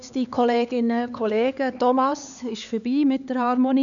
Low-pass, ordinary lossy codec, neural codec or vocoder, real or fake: 7.2 kHz; none; codec, 16 kHz, 4 kbps, X-Codec, HuBERT features, trained on LibriSpeech; fake